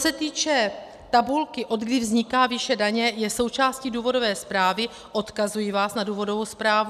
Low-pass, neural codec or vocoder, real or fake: 14.4 kHz; none; real